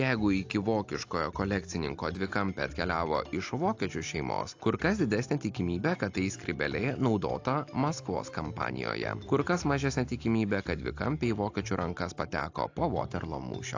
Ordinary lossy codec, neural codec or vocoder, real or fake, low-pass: AAC, 48 kbps; none; real; 7.2 kHz